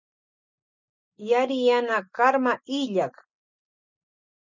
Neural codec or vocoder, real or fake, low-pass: none; real; 7.2 kHz